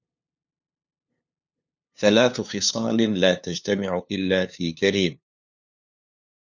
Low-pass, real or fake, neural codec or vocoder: 7.2 kHz; fake; codec, 16 kHz, 2 kbps, FunCodec, trained on LibriTTS, 25 frames a second